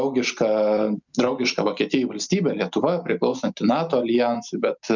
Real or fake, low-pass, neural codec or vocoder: real; 7.2 kHz; none